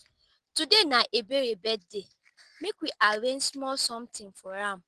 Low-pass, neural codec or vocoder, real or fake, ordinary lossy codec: 10.8 kHz; none; real; Opus, 16 kbps